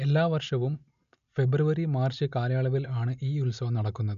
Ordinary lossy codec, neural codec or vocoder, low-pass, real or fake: none; none; 7.2 kHz; real